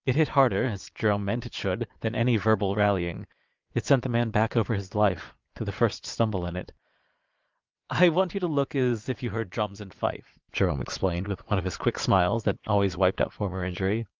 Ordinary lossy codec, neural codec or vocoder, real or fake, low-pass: Opus, 32 kbps; vocoder, 22.05 kHz, 80 mel bands, Vocos; fake; 7.2 kHz